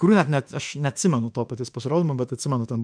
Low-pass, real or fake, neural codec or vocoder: 9.9 kHz; fake; autoencoder, 48 kHz, 32 numbers a frame, DAC-VAE, trained on Japanese speech